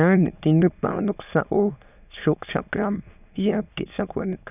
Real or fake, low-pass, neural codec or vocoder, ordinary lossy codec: fake; 3.6 kHz; autoencoder, 22.05 kHz, a latent of 192 numbers a frame, VITS, trained on many speakers; none